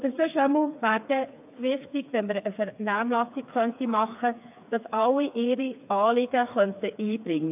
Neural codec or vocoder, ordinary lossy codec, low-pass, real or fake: codec, 16 kHz, 4 kbps, FreqCodec, smaller model; AAC, 32 kbps; 3.6 kHz; fake